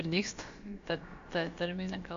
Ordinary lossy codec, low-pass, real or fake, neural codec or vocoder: MP3, 48 kbps; 7.2 kHz; fake; codec, 16 kHz, about 1 kbps, DyCAST, with the encoder's durations